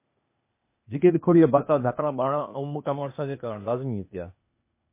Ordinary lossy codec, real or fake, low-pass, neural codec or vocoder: MP3, 24 kbps; fake; 3.6 kHz; codec, 16 kHz, 0.8 kbps, ZipCodec